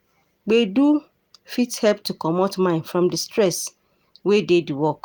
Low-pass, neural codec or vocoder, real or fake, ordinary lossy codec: 19.8 kHz; none; real; Opus, 32 kbps